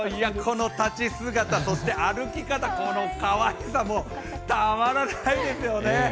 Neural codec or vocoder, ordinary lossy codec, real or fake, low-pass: none; none; real; none